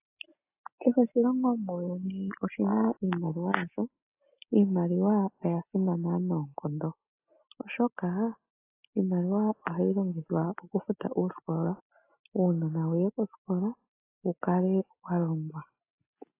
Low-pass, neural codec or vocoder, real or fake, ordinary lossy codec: 3.6 kHz; none; real; AAC, 24 kbps